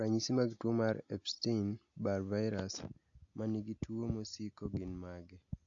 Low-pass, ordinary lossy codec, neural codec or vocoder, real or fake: 7.2 kHz; AAC, 48 kbps; none; real